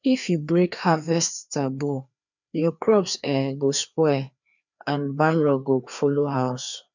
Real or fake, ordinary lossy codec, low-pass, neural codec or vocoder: fake; none; 7.2 kHz; codec, 16 kHz, 2 kbps, FreqCodec, larger model